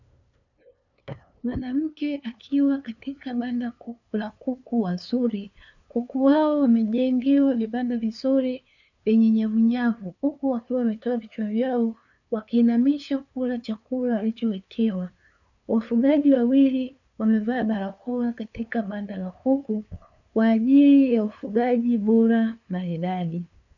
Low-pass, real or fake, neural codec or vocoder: 7.2 kHz; fake; codec, 16 kHz, 2 kbps, FunCodec, trained on LibriTTS, 25 frames a second